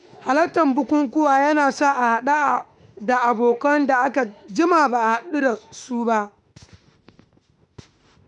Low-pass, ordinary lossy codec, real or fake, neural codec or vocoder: 10.8 kHz; none; fake; autoencoder, 48 kHz, 32 numbers a frame, DAC-VAE, trained on Japanese speech